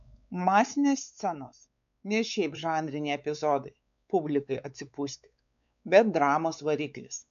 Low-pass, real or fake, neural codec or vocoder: 7.2 kHz; fake; codec, 16 kHz, 4 kbps, X-Codec, WavLM features, trained on Multilingual LibriSpeech